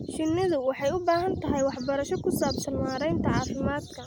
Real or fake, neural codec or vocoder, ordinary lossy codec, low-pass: real; none; none; none